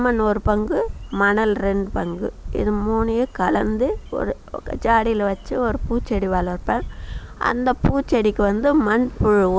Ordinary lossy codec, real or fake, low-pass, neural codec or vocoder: none; real; none; none